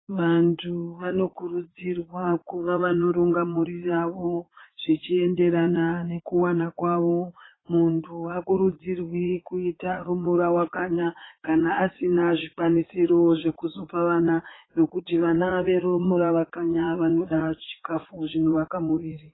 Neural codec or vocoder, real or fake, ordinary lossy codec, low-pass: vocoder, 44.1 kHz, 80 mel bands, Vocos; fake; AAC, 16 kbps; 7.2 kHz